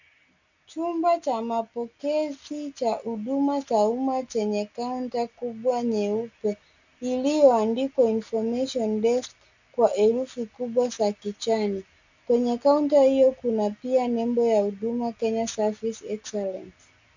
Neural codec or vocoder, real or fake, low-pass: none; real; 7.2 kHz